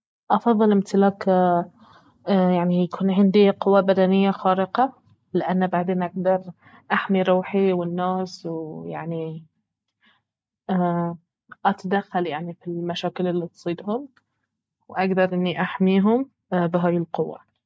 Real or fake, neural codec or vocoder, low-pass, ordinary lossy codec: real; none; none; none